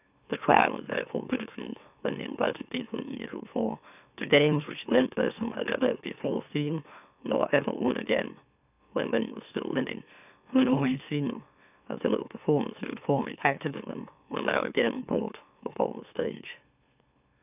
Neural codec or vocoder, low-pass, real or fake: autoencoder, 44.1 kHz, a latent of 192 numbers a frame, MeloTTS; 3.6 kHz; fake